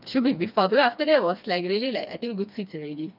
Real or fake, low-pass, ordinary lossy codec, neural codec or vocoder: fake; 5.4 kHz; none; codec, 16 kHz, 2 kbps, FreqCodec, smaller model